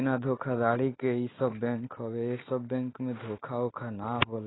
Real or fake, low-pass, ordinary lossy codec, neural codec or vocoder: real; 7.2 kHz; AAC, 16 kbps; none